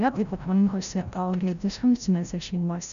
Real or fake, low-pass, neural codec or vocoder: fake; 7.2 kHz; codec, 16 kHz, 0.5 kbps, FreqCodec, larger model